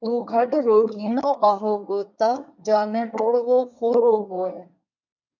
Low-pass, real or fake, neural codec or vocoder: 7.2 kHz; fake; codec, 24 kHz, 1 kbps, SNAC